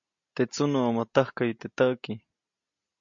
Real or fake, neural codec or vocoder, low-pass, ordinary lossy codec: real; none; 7.2 kHz; MP3, 48 kbps